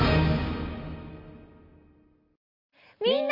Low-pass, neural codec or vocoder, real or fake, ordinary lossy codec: 5.4 kHz; none; real; none